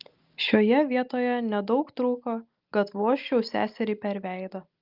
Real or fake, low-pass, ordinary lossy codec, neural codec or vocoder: real; 5.4 kHz; Opus, 32 kbps; none